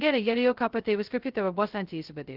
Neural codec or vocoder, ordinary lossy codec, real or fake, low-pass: codec, 16 kHz, 0.2 kbps, FocalCodec; Opus, 16 kbps; fake; 5.4 kHz